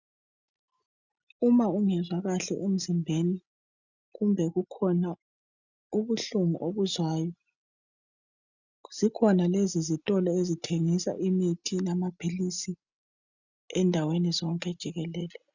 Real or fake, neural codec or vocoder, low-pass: real; none; 7.2 kHz